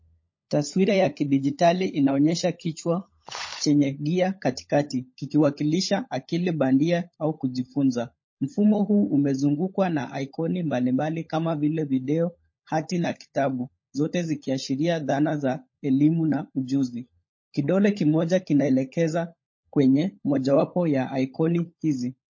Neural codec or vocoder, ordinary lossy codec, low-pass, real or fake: codec, 16 kHz, 16 kbps, FunCodec, trained on LibriTTS, 50 frames a second; MP3, 32 kbps; 7.2 kHz; fake